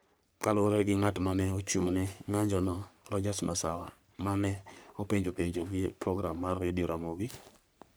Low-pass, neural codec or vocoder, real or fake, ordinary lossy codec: none; codec, 44.1 kHz, 3.4 kbps, Pupu-Codec; fake; none